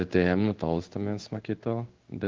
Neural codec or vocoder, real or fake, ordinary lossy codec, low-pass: codec, 16 kHz in and 24 kHz out, 1 kbps, XY-Tokenizer; fake; Opus, 32 kbps; 7.2 kHz